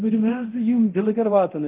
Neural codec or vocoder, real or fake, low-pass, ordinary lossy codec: codec, 24 kHz, 0.9 kbps, DualCodec; fake; 3.6 kHz; Opus, 32 kbps